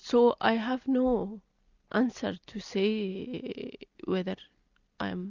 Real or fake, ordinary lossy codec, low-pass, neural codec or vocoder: real; Opus, 64 kbps; 7.2 kHz; none